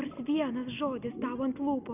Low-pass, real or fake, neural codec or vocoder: 3.6 kHz; real; none